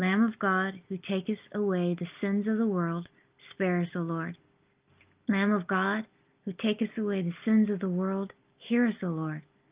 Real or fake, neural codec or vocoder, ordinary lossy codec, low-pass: real; none; Opus, 32 kbps; 3.6 kHz